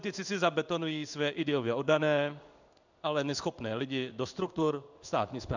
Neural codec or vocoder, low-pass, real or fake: codec, 16 kHz in and 24 kHz out, 1 kbps, XY-Tokenizer; 7.2 kHz; fake